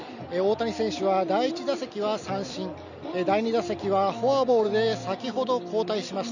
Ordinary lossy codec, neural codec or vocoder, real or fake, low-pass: none; none; real; 7.2 kHz